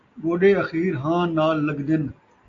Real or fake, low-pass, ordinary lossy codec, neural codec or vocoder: real; 7.2 kHz; AAC, 48 kbps; none